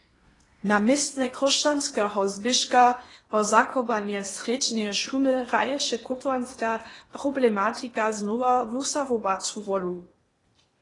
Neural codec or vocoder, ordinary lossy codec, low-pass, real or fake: codec, 16 kHz in and 24 kHz out, 0.8 kbps, FocalCodec, streaming, 65536 codes; AAC, 32 kbps; 10.8 kHz; fake